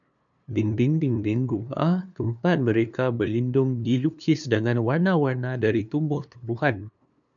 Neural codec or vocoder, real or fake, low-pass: codec, 16 kHz, 2 kbps, FunCodec, trained on LibriTTS, 25 frames a second; fake; 7.2 kHz